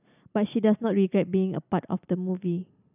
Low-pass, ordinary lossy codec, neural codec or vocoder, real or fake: 3.6 kHz; none; none; real